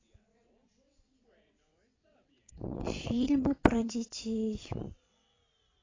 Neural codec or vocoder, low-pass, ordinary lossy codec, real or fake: vocoder, 22.05 kHz, 80 mel bands, WaveNeXt; 7.2 kHz; AAC, 32 kbps; fake